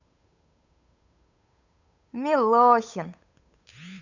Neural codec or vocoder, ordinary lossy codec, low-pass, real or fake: codec, 16 kHz, 8 kbps, FunCodec, trained on Chinese and English, 25 frames a second; none; 7.2 kHz; fake